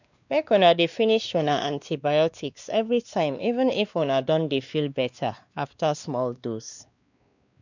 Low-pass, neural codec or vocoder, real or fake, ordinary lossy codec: 7.2 kHz; codec, 16 kHz, 2 kbps, X-Codec, WavLM features, trained on Multilingual LibriSpeech; fake; none